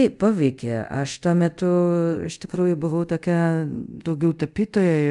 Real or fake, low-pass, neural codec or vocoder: fake; 10.8 kHz; codec, 24 kHz, 0.5 kbps, DualCodec